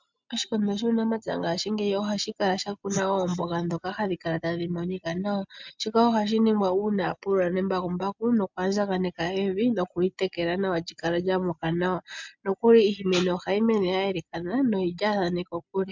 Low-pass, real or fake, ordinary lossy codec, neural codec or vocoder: 7.2 kHz; real; MP3, 64 kbps; none